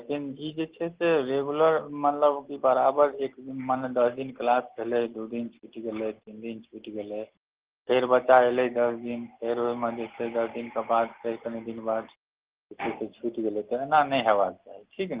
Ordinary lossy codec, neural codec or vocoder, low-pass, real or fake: Opus, 16 kbps; none; 3.6 kHz; real